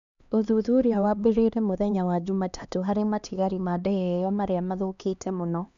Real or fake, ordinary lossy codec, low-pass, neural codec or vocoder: fake; none; 7.2 kHz; codec, 16 kHz, 2 kbps, X-Codec, HuBERT features, trained on LibriSpeech